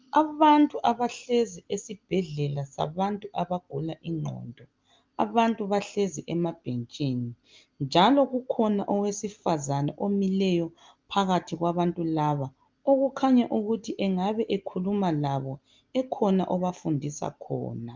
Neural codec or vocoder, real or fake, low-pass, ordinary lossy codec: none; real; 7.2 kHz; Opus, 24 kbps